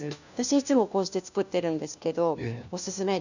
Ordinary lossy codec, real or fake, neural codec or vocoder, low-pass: none; fake; codec, 16 kHz, 1 kbps, FunCodec, trained on LibriTTS, 50 frames a second; 7.2 kHz